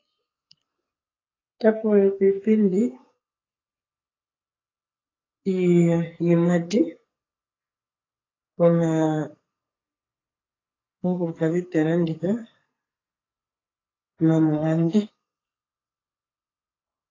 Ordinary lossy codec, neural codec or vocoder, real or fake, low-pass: AAC, 32 kbps; codec, 44.1 kHz, 2.6 kbps, SNAC; fake; 7.2 kHz